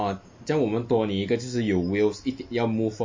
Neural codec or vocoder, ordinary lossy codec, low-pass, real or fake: none; MP3, 32 kbps; 7.2 kHz; real